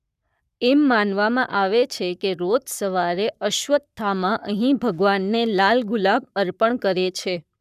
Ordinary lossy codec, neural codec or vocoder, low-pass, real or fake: none; codec, 44.1 kHz, 7.8 kbps, Pupu-Codec; 14.4 kHz; fake